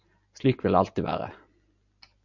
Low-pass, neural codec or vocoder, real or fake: 7.2 kHz; none; real